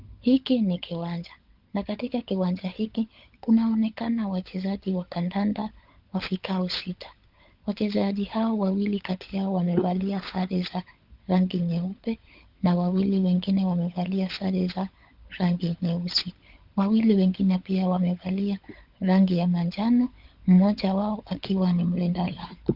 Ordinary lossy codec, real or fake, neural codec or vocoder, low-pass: Opus, 24 kbps; fake; codec, 16 kHz, 16 kbps, FunCodec, trained on LibriTTS, 50 frames a second; 5.4 kHz